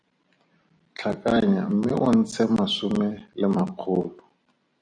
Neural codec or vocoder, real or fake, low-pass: vocoder, 44.1 kHz, 128 mel bands every 256 samples, BigVGAN v2; fake; 9.9 kHz